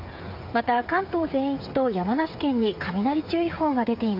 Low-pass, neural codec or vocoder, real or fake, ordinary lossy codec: 5.4 kHz; codec, 16 kHz, 8 kbps, FreqCodec, smaller model; fake; MP3, 32 kbps